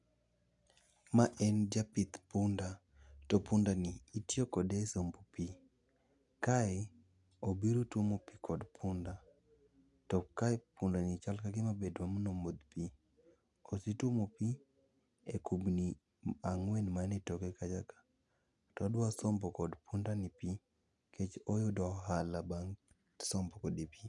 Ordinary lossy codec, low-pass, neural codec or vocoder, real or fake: none; 10.8 kHz; none; real